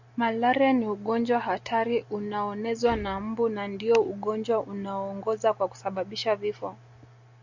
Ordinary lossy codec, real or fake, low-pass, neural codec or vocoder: Opus, 64 kbps; real; 7.2 kHz; none